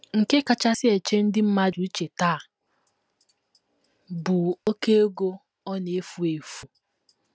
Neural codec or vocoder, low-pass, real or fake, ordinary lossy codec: none; none; real; none